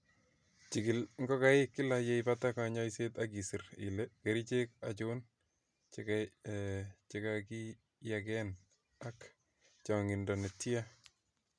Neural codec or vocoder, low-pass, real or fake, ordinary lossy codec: none; none; real; none